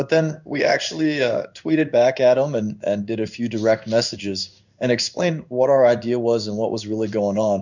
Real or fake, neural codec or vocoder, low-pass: fake; codec, 16 kHz in and 24 kHz out, 1 kbps, XY-Tokenizer; 7.2 kHz